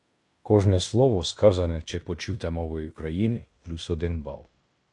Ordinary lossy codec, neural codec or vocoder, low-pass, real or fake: AAC, 64 kbps; codec, 16 kHz in and 24 kHz out, 0.9 kbps, LongCat-Audio-Codec, fine tuned four codebook decoder; 10.8 kHz; fake